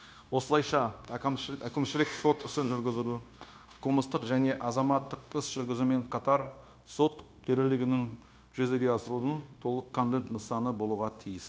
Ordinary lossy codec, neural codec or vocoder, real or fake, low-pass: none; codec, 16 kHz, 0.9 kbps, LongCat-Audio-Codec; fake; none